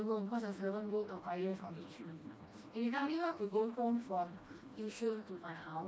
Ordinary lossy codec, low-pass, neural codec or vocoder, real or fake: none; none; codec, 16 kHz, 1 kbps, FreqCodec, smaller model; fake